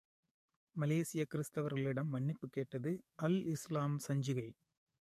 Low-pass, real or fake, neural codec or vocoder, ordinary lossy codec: 14.4 kHz; fake; codec, 44.1 kHz, 7.8 kbps, DAC; MP3, 64 kbps